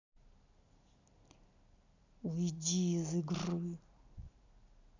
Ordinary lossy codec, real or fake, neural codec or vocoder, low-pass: none; real; none; 7.2 kHz